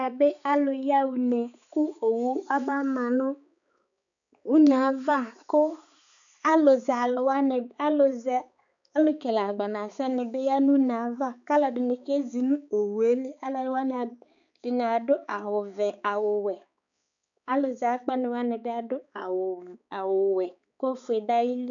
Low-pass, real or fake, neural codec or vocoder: 7.2 kHz; fake; codec, 16 kHz, 4 kbps, X-Codec, HuBERT features, trained on balanced general audio